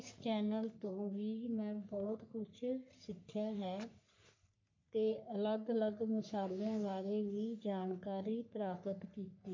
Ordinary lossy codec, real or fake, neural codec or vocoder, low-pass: MP3, 48 kbps; fake; codec, 44.1 kHz, 3.4 kbps, Pupu-Codec; 7.2 kHz